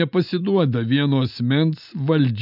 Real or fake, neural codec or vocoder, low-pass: real; none; 5.4 kHz